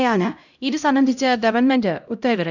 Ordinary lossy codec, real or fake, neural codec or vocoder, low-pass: none; fake; codec, 16 kHz, 0.5 kbps, X-Codec, HuBERT features, trained on LibriSpeech; 7.2 kHz